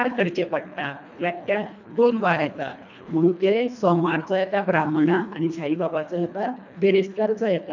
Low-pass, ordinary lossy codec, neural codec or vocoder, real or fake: 7.2 kHz; none; codec, 24 kHz, 1.5 kbps, HILCodec; fake